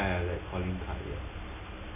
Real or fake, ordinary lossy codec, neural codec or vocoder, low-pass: real; none; none; 3.6 kHz